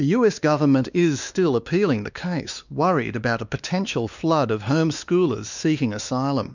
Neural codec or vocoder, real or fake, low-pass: codec, 16 kHz, 2 kbps, X-Codec, WavLM features, trained on Multilingual LibriSpeech; fake; 7.2 kHz